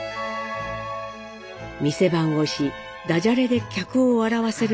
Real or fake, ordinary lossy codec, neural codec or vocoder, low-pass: real; none; none; none